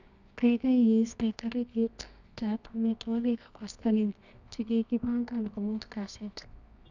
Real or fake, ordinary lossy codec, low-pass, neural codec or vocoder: fake; none; 7.2 kHz; codec, 24 kHz, 0.9 kbps, WavTokenizer, medium music audio release